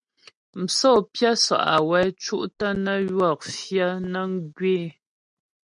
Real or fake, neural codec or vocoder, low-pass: real; none; 9.9 kHz